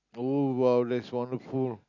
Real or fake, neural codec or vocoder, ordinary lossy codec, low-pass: real; none; none; 7.2 kHz